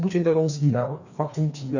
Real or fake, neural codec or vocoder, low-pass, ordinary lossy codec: fake; codec, 16 kHz, 2 kbps, FreqCodec, larger model; 7.2 kHz; none